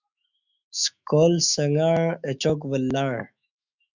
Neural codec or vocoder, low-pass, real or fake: autoencoder, 48 kHz, 128 numbers a frame, DAC-VAE, trained on Japanese speech; 7.2 kHz; fake